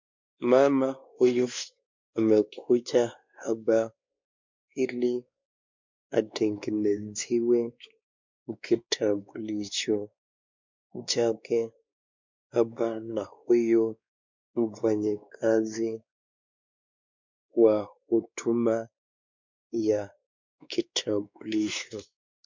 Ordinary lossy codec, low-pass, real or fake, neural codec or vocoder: MP3, 64 kbps; 7.2 kHz; fake; codec, 16 kHz, 2 kbps, X-Codec, WavLM features, trained on Multilingual LibriSpeech